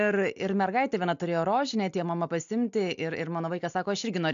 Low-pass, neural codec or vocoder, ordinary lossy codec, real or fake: 7.2 kHz; none; AAC, 64 kbps; real